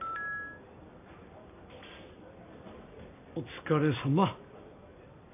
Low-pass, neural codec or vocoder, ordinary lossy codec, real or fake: 3.6 kHz; none; AAC, 32 kbps; real